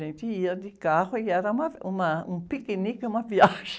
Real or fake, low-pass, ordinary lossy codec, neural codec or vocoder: real; none; none; none